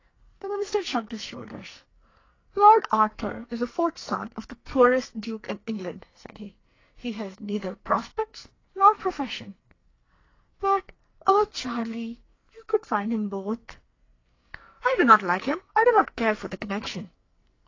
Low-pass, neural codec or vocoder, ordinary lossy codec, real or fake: 7.2 kHz; codec, 44.1 kHz, 2.6 kbps, SNAC; AAC, 32 kbps; fake